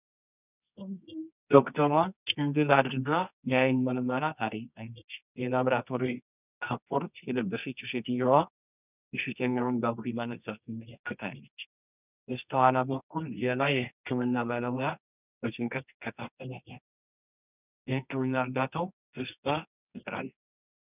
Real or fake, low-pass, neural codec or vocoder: fake; 3.6 kHz; codec, 24 kHz, 0.9 kbps, WavTokenizer, medium music audio release